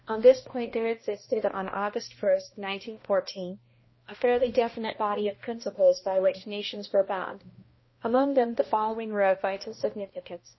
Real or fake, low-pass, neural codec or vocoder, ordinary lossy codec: fake; 7.2 kHz; codec, 16 kHz, 1 kbps, X-Codec, HuBERT features, trained on balanced general audio; MP3, 24 kbps